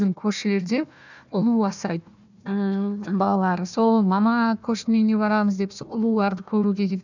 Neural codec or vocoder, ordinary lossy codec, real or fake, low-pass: codec, 16 kHz, 1 kbps, FunCodec, trained on Chinese and English, 50 frames a second; none; fake; 7.2 kHz